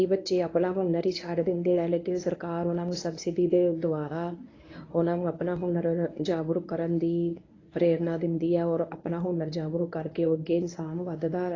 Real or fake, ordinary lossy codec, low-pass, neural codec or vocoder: fake; AAC, 32 kbps; 7.2 kHz; codec, 24 kHz, 0.9 kbps, WavTokenizer, medium speech release version 1